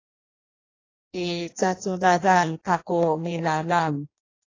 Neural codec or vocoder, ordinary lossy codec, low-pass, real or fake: codec, 16 kHz in and 24 kHz out, 0.6 kbps, FireRedTTS-2 codec; AAC, 32 kbps; 7.2 kHz; fake